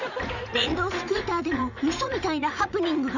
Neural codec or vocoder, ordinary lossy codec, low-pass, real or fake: vocoder, 44.1 kHz, 80 mel bands, Vocos; none; 7.2 kHz; fake